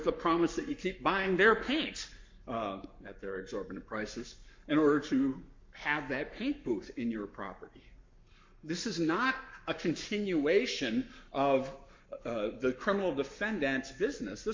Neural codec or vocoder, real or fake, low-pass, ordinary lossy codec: codec, 44.1 kHz, 7.8 kbps, Pupu-Codec; fake; 7.2 kHz; MP3, 48 kbps